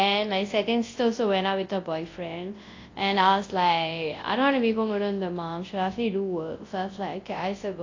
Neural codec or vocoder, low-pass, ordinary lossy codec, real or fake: codec, 24 kHz, 0.9 kbps, WavTokenizer, large speech release; 7.2 kHz; AAC, 32 kbps; fake